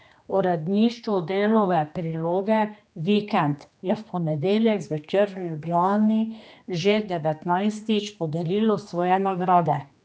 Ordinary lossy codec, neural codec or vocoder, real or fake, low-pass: none; codec, 16 kHz, 2 kbps, X-Codec, HuBERT features, trained on general audio; fake; none